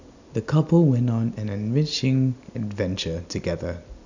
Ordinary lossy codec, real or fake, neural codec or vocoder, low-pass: none; real; none; 7.2 kHz